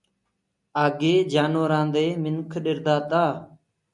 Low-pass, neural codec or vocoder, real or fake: 10.8 kHz; none; real